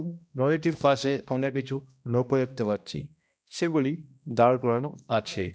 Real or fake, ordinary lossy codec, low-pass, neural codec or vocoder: fake; none; none; codec, 16 kHz, 1 kbps, X-Codec, HuBERT features, trained on balanced general audio